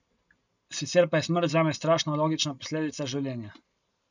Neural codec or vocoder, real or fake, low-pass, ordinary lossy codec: none; real; 7.2 kHz; none